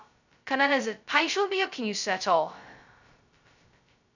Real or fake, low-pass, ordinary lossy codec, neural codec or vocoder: fake; 7.2 kHz; none; codec, 16 kHz, 0.2 kbps, FocalCodec